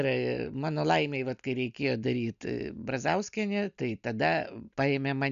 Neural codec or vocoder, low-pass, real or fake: none; 7.2 kHz; real